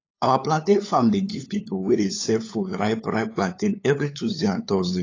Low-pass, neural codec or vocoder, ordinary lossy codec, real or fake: 7.2 kHz; codec, 16 kHz, 8 kbps, FunCodec, trained on LibriTTS, 25 frames a second; AAC, 32 kbps; fake